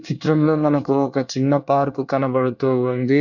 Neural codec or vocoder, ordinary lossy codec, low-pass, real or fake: codec, 24 kHz, 1 kbps, SNAC; none; 7.2 kHz; fake